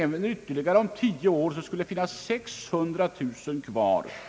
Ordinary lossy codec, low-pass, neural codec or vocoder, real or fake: none; none; none; real